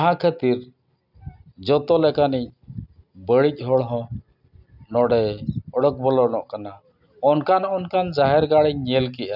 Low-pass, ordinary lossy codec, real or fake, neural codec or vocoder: 5.4 kHz; none; real; none